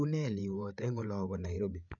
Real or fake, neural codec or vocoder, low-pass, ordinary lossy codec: fake; codec, 16 kHz, 8 kbps, FreqCodec, larger model; 7.2 kHz; none